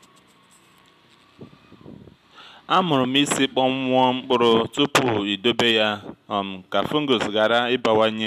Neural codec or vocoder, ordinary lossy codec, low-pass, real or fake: none; MP3, 96 kbps; 14.4 kHz; real